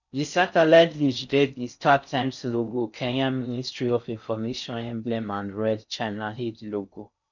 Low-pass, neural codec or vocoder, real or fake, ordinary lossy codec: 7.2 kHz; codec, 16 kHz in and 24 kHz out, 0.6 kbps, FocalCodec, streaming, 4096 codes; fake; none